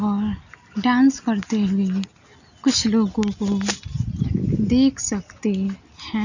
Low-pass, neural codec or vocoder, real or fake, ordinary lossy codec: 7.2 kHz; none; real; none